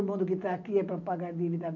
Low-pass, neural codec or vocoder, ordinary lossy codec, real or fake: 7.2 kHz; none; none; real